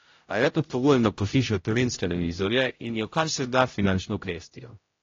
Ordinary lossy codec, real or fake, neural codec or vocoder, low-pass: AAC, 32 kbps; fake; codec, 16 kHz, 0.5 kbps, X-Codec, HuBERT features, trained on general audio; 7.2 kHz